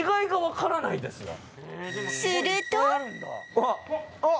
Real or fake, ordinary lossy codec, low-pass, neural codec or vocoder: real; none; none; none